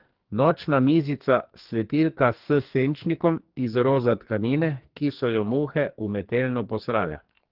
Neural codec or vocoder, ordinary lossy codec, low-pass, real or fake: codec, 44.1 kHz, 2.6 kbps, SNAC; Opus, 16 kbps; 5.4 kHz; fake